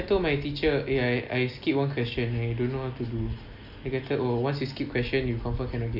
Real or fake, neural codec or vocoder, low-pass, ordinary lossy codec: real; none; 5.4 kHz; none